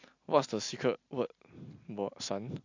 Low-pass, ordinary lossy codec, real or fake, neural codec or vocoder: 7.2 kHz; AAC, 48 kbps; real; none